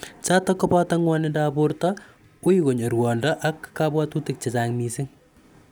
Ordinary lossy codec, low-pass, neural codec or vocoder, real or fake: none; none; none; real